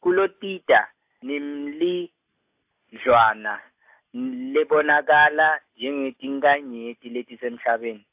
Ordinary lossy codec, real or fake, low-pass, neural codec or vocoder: AAC, 32 kbps; real; 3.6 kHz; none